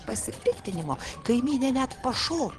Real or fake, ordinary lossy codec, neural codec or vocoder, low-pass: fake; Opus, 16 kbps; vocoder, 22.05 kHz, 80 mel bands, Vocos; 9.9 kHz